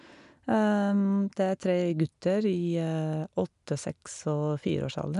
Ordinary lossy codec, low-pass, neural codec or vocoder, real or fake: none; 10.8 kHz; none; real